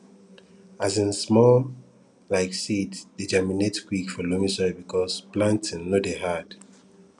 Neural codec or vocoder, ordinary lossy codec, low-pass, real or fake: none; none; 10.8 kHz; real